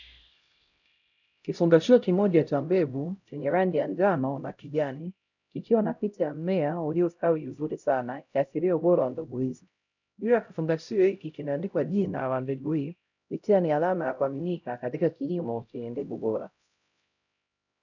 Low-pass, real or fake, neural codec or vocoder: 7.2 kHz; fake; codec, 16 kHz, 0.5 kbps, X-Codec, HuBERT features, trained on LibriSpeech